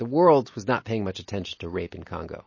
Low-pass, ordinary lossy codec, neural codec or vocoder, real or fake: 7.2 kHz; MP3, 32 kbps; none; real